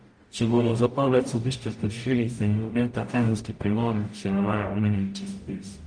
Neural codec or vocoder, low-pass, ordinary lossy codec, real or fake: codec, 44.1 kHz, 0.9 kbps, DAC; 9.9 kHz; Opus, 32 kbps; fake